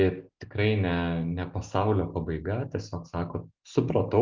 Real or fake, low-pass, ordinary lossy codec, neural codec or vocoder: real; 7.2 kHz; Opus, 24 kbps; none